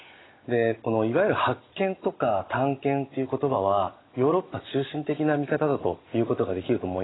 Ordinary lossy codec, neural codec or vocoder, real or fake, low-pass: AAC, 16 kbps; none; real; 7.2 kHz